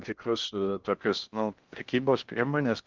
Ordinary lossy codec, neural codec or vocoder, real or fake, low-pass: Opus, 24 kbps; codec, 16 kHz in and 24 kHz out, 0.6 kbps, FocalCodec, streaming, 4096 codes; fake; 7.2 kHz